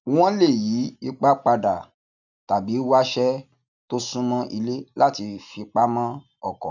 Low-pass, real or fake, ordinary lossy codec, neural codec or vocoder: 7.2 kHz; real; none; none